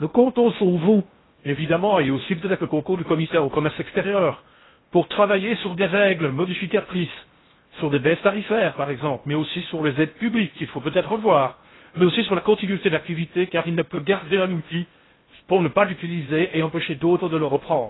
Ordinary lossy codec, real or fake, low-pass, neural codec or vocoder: AAC, 16 kbps; fake; 7.2 kHz; codec, 16 kHz in and 24 kHz out, 0.6 kbps, FocalCodec, streaming, 4096 codes